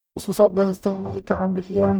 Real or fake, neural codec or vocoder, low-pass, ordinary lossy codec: fake; codec, 44.1 kHz, 0.9 kbps, DAC; none; none